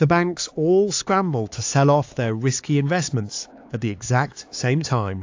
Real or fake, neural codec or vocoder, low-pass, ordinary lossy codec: fake; codec, 16 kHz, 4 kbps, X-Codec, HuBERT features, trained on LibriSpeech; 7.2 kHz; AAC, 48 kbps